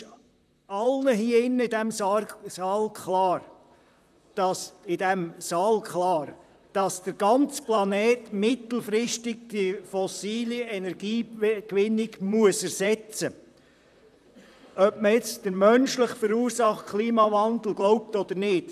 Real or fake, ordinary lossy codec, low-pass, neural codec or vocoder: fake; none; 14.4 kHz; vocoder, 44.1 kHz, 128 mel bands, Pupu-Vocoder